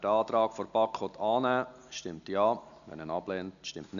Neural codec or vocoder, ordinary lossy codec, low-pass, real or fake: none; none; 7.2 kHz; real